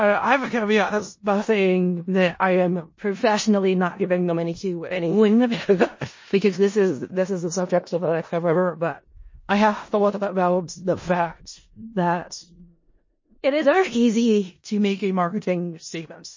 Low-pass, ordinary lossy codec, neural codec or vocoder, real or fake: 7.2 kHz; MP3, 32 kbps; codec, 16 kHz in and 24 kHz out, 0.4 kbps, LongCat-Audio-Codec, four codebook decoder; fake